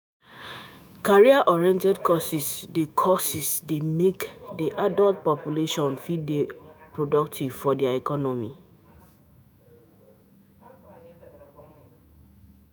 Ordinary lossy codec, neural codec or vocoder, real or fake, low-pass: none; autoencoder, 48 kHz, 128 numbers a frame, DAC-VAE, trained on Japanese speech; fake; none